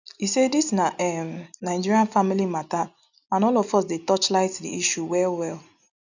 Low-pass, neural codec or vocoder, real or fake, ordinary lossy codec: 7.2 kHz; none; real; none